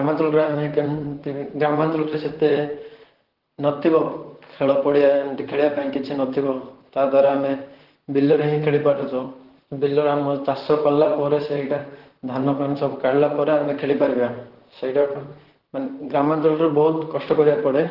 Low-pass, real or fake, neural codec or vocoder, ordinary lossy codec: 5.4 kHz; fake; vocoder, 44.1 kHz, 128 mel bands, Pupu-Vocoder; Opus, 16 kbps